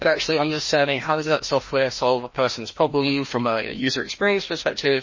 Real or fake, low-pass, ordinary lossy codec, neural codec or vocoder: fake; 7.2 kHz; MP3, 32 kbps; codec, 16 kHz, 1 kbps, FreqCodec, larger model